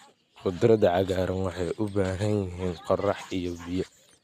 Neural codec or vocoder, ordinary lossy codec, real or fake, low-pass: none; none; real; 14.4 kHz